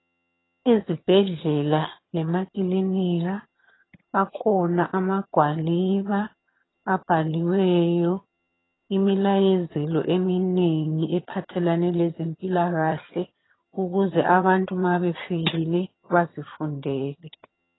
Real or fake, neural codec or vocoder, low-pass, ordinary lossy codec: fake; vocoder, 22.05 kHz, 80 mel bands, HiFi-GAN; 7.2 kHz; AAC, 16 kbps